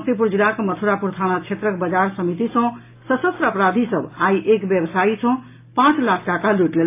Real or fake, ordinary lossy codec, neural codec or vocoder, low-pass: real; AAC, 24 kbps; none; 3.6 kHz